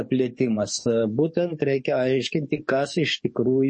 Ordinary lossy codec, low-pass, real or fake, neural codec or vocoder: MP3, 32 kbps; 10.8 kHz; fake; codec, 44.1 kHz, 7.8 kbps, DAC